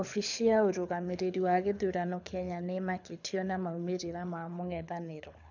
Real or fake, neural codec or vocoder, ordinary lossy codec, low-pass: fake; codec, 24 kHz, 6 kbps, HILCodec; none; 7.2 kHz